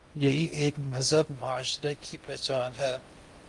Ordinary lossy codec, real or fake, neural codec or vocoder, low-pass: Opus, 32 kbps; fake; codec, 16 kHz in and 24 kHz out, 0.6 kbps, FocalCodec, streaming, 2048 codes; 10.8 kHz